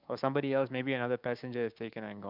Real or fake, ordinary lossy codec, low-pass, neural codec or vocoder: fake; none; 5.4 kHz; codec, 16 kHz, 6 kbps, DAC